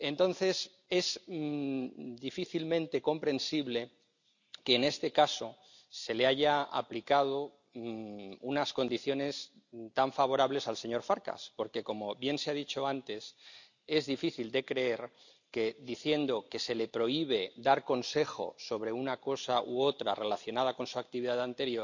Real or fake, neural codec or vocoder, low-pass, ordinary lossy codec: real; none; 7.2 kHz; none